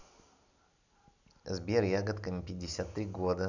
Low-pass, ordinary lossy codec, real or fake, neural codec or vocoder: 7.2 kHz; none; real; none